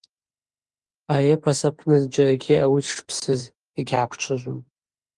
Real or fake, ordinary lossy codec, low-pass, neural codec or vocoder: fake; Opus, 24 kbps; 10.8 kHz; autoencoder, 48 kHz, 32 numbers a frame, DAC-VAE, trained on Japanese speech